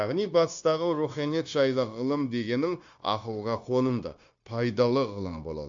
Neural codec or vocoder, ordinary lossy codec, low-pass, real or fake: codec, 16 kHz, 0.9 kbps, LongCat-Audio-Codec; AAC, 64 kbps; 7.2 kHz; fake